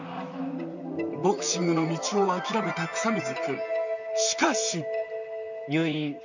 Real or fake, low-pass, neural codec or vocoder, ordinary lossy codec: fake; 7.2 kHz; vocoder, 44.1 kHz, 128 mel bands, Pupu-Vocoder; none